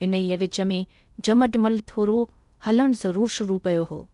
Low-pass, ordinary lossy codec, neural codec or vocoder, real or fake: 10.8 kHz; none; codec, 16 kHz in and 24 kHz out, 0.6 kbps, FocalCodec, streaming, 2048 codes; fake